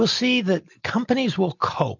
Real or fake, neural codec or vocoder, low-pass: real; none; 7.2 kHz